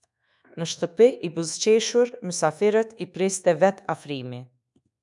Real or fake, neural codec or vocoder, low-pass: fake; codec, 24 kHz, 1.2 kbps, DualCodec; 10.8 kHz